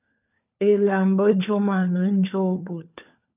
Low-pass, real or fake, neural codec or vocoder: 3.6 kHz; fake; codec, 16 kHz, 4 kbps, FunCodec, trained on LibriTTS, 50 frames a second